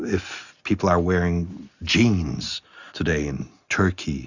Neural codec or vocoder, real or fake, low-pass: none; real; 7.2 kHz